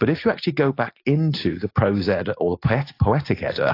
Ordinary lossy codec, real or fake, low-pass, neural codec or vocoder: AAC, 32 kbps; real; 5.4 kHz; none